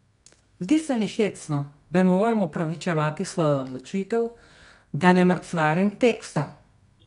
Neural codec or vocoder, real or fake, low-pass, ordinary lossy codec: codec, 24 kHz, 0.9 kbps, WavTokenizer, medium music audio release; fake; 10.8 kHz; none